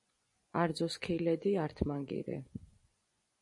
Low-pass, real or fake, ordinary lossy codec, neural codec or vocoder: 10.8 kHz; fake; MP3, 48 kbps; vocoder, 24 kHz, 100 mel bands, Vocos